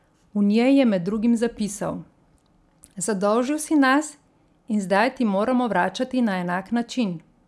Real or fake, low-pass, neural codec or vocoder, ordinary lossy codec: real; none; none; none